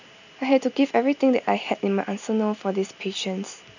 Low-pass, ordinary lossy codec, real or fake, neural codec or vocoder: 7.2 kHz; none; real; none